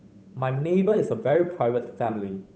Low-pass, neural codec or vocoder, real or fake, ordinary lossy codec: none; codec, 16 kHz, 8 kbps, FunCodec, trained on Chinese and English, 25 frames a second; fake; none